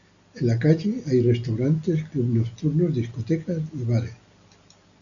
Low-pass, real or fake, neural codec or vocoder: 7.2 kHz; real; none